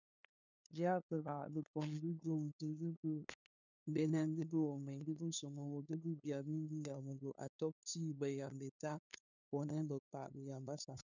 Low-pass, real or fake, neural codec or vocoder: 7.2 kHz; fake; codec, 16 kHz, 2 kbps, FunCodec, trained on LibriTTS, 25 frames a second